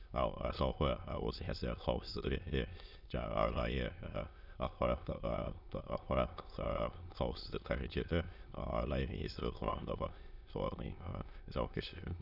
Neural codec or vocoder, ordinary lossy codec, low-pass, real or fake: autoencoder, 22.05 kHz, a latent of 192 numbers a frame, VITS, trained on many speakers; none; 5.4 kHz; fake